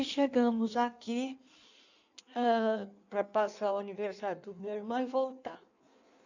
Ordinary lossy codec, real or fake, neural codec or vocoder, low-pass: none; fake; codec, 16 kHz in and 24 kHz out, 1.1 kbps, FireRedTTS-2 codec; 7.2 kHz